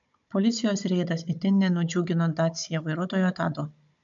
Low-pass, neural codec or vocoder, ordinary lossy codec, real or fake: 7.2 kHz; codec, 16 kHz, 16 kbps, FunCodec, trained on Chinese and English, 50 frames a second; MP3, 64 kbps; fake